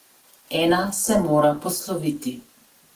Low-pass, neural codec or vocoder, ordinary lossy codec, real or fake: 14.4 kHz; none; Opus, 16 kbps; real